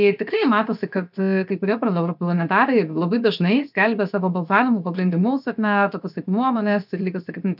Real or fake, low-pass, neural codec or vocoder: fake; 5.4 kHz; codec, 16 kHz, 0.7 kbps, FocalCodec